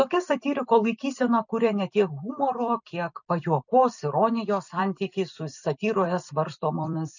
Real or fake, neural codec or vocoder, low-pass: fake; vocoder, 44.1 kHz, 128 mel bands every 256 samples, BigVGAN v2; 7.2 kHz